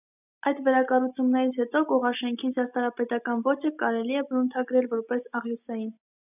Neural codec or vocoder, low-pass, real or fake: none; 3.6 kHz; real